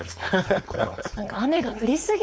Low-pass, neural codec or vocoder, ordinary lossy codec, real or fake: none; codec, 16 kHz, 4.8 kbps, FACodec; none; fake